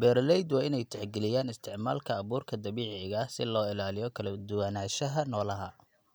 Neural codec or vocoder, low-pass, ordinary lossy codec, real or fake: none; none; none; real